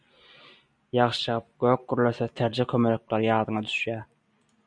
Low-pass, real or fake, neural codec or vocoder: 9.9 kHz; real; none